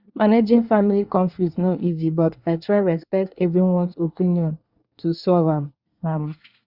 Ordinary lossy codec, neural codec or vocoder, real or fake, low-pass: Opus, 64 kbps; codec, 24 kHz, 1 kbps, SNAC; fake; 5.4 kHz